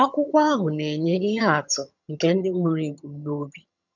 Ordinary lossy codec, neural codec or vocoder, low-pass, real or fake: none; vocoder, 22.05 kHz, 80 mel bands, HiFi-GAN; 7.2 kHz; fake